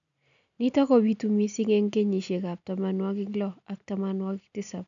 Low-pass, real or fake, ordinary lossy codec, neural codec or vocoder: 7.2 kHz; real; none; none